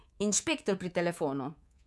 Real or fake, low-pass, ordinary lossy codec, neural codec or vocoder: fake; none; none; codec, 24 kHz, 3.1 kbps, DualCodec